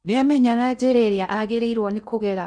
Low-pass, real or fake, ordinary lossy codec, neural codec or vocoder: 9.9 kHz; fake; none; codec, 16 kHz in and 24 kHz out, 0.6 kbps, FocalCodec, streaming, 2048 codes